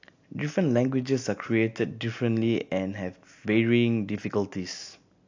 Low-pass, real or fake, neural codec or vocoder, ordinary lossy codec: 7.2 kHz; real; none; AAC, 48 kbps